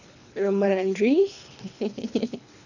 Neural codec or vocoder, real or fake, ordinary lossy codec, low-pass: codec, 24 kHz, 3 kbps, HILCodec; fake; none; 7.2 kHz